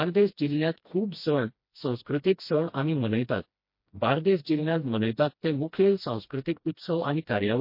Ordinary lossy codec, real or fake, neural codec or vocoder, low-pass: MP3, 32 kbps; fake; codec, 16 kHz, 1 kbps, FreqCodec, smaller model; 5.4 kHz